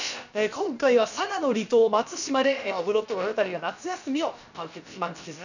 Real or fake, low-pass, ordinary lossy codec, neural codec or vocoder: fake; 7.2 kHz; none; codec, 16 kHz, about 1 kbps, DyCAST, with the encoder's durations